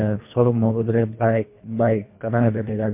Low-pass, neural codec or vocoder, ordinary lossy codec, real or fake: 3.6 kHz; codec, 24 kHz, 1.5 kbps, HILCodec; MP3, 24 kbps; fake